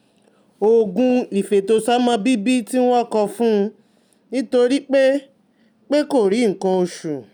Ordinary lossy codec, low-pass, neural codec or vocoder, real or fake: none; 19.8 kHz; none; real